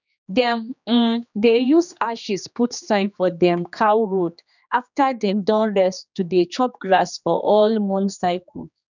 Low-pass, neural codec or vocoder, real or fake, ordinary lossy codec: 7.2 kHz; codec, 16 kHz, 2 kbps, X-Codec, HuBERT features, trained on general audio; fake; none